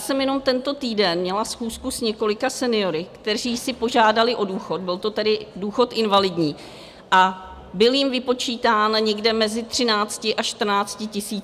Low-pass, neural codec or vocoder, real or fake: 14.4 kHz; none; real